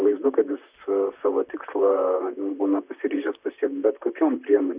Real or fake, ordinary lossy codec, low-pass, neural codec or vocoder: fake; Opus, 64 kbps; 3.6 kHz; vocoder, 44.1 kHz, 128 mel bands, Pupu-Vocoder